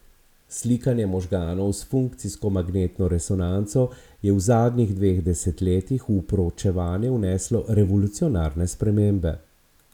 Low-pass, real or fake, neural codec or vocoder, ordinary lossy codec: 19.8 kHz; real; none; none